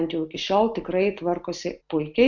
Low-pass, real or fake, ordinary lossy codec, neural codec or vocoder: 7.2 kHz; real; MP3, 64 kbps; none